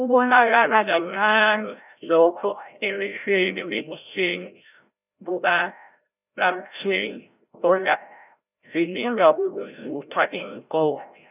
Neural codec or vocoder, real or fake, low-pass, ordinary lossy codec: codec, 16 kHz, 0.5 kbps, FreqCodec, larger model; fake; 3.6 kHz; none